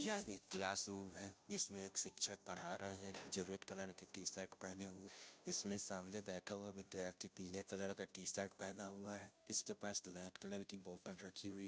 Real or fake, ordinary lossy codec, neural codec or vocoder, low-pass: fake; none; codec, 16 kHz, 0.5 kbps, FunCodec, trained on Chinese and English, 25 frames a second; none